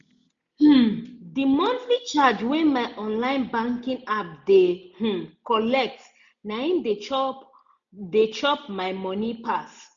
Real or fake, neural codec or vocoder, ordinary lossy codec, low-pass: real; none; none; 7.2 kHz